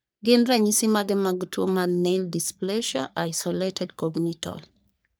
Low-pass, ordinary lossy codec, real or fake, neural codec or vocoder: none; none; fake; codec, 44.1 kHz, 3.4 kbps, Pupu-Codec